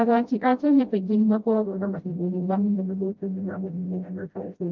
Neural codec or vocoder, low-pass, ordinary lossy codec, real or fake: codec, 16 kHz, 0.5 kbps, FreqCodec, smaller model; 7.2 kHz; Opus, 24 kbps; fake